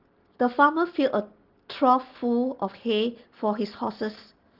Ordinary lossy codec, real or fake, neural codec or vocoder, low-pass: Opus, 16 kbps; real; none; 5.4 kHz